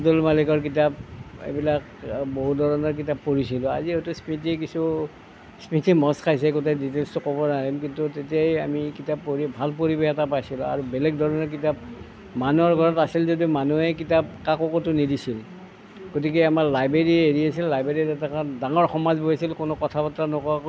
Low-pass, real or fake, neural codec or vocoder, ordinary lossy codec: none; real; none; none